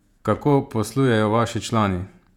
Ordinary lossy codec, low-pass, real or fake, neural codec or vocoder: none; 19.8 kHz; fake; vocoder, 48 kHz, 128 mel bands, Vocos